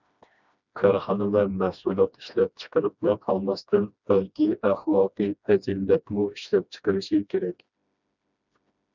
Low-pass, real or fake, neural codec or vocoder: 7.2 kHz; fake; codec, 16 kHz, 1 kbps, FreqCodec, smaller model